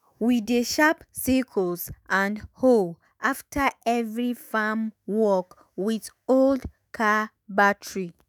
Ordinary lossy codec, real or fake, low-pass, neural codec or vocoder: none; fake; none; autoencoder, 48 kHz, 128 numbers a frame, DAC-VAE, trained on Japanese speech